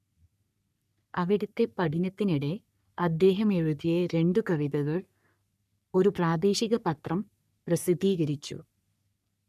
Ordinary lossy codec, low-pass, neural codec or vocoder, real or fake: none; 14.4 kHz; codec, 44.1 kHz, 3.4 kbps, Pupu-Codec; fake